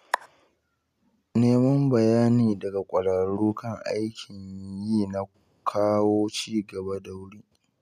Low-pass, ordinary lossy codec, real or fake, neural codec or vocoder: 14.4 kHz; none; real; none